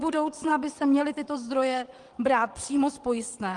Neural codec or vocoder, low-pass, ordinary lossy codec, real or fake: vocoder, 22.05 kHz, 80 mel bands, WaveNeXt; 9.9 kHz; Opus, 24 kbps; fake